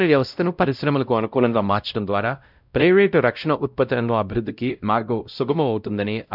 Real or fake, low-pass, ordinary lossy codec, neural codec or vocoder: fake; 5.4 kHz; none; codec, 16 kHz, 0.5 kbps, X-Codec, WavLM features, trained on Multilingual LibriSpeech